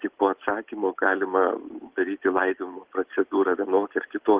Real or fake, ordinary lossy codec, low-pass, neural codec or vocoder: real; Opus, 16 kbps; 3.6 kHz; none